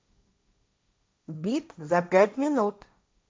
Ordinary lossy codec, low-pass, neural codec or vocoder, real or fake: none; none; codec, 16 kHz, 1.1 kbps, Voila-Tokenizer; fake